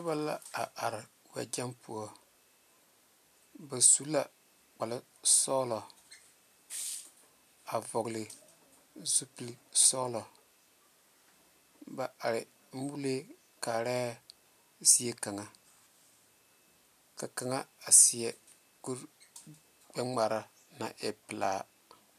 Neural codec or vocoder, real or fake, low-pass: none; real; 14.4 kHz